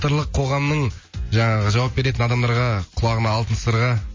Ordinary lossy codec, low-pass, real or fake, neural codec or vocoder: MP3, 32 kbps; 7.2 kHz; real; none